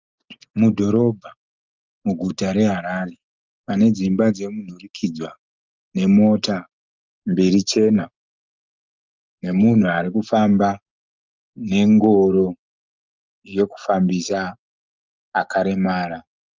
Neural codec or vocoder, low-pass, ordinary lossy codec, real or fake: none; 7.2 kHz; Opus, 32 kbps; real